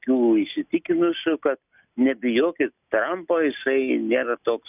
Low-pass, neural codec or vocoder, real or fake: 3.6 kHz; none; real